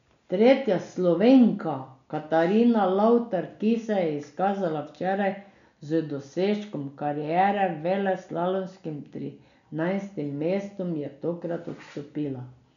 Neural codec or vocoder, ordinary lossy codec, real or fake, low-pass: none; none; real; 7.2 kHz